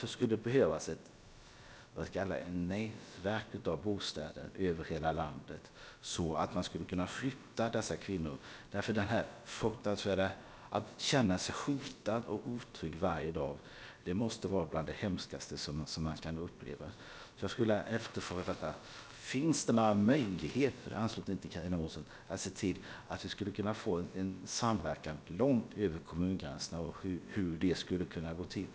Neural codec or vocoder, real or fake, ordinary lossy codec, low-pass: codec, 16 kHz, about 1 kbps, DyCAST, with the encoder's durations; fake; none; none